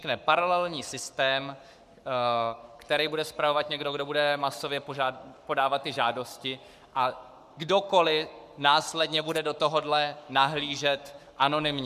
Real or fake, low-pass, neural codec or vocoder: fake; 14.4 kHz; codec, 44.1 kHz, 7.8 kbps, Pupu-Codec